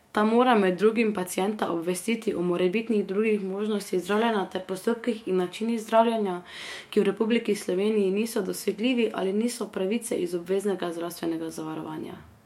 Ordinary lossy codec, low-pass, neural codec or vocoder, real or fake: MP3, 64 kbps; 19.8 kHz; autoencoder, 48 kHz, 128 numbers a frame, DAC-VAE, trained on Japanese speech; fake